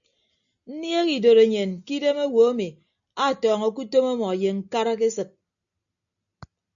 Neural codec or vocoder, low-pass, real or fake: none; 7.2 kHz; real